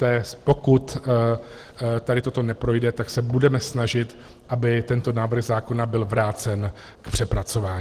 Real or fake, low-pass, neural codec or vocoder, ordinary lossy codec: real; 14.4 kHz; none; Opus, 16 kbps